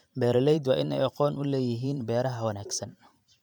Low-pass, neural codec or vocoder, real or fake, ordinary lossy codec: 19.8 kHz; vocoder, 44.1 kHz, 128 mel bands every 512 samples, BigVGAN v2; fake; none